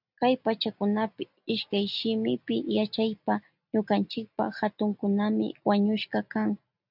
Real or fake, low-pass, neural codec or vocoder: real; 5.4 kHz; none